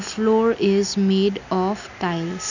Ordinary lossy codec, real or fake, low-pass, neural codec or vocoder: none; real; 7.2 kHz; none